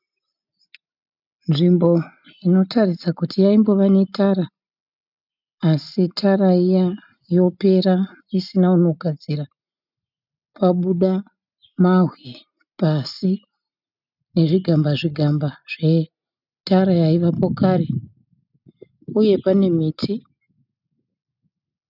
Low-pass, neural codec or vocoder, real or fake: 5.4 kHz; none; real